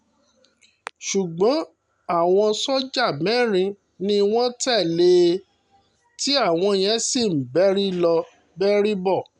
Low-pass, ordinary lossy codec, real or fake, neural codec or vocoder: 10.8 kHz; none; real; none